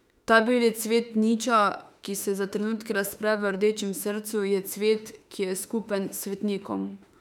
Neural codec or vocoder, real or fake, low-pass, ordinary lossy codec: autoencoder, 48 kHz, 32 numbers a frame, DAC-VAE, trained on Japanese speech; fake; 19.8 kHz; none